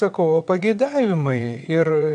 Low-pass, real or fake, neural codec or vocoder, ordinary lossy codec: 9.9 kHz; fake; vocoder, 22.05 kHz, 80 mel bands, WaveNeXt; AAC, 64 kbps